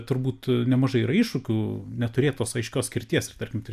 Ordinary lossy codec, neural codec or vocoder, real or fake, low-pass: AAC, 96 kbps; none; real; 14.4 kHz